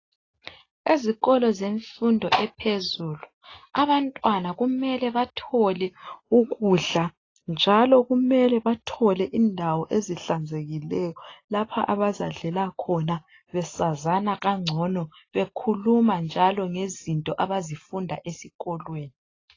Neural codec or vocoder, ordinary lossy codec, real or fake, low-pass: none; AAC, 32 kbps; real; 7.2 kHz